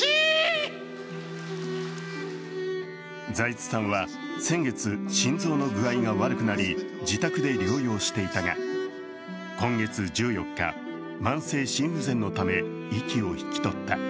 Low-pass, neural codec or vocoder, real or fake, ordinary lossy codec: none; none; real; none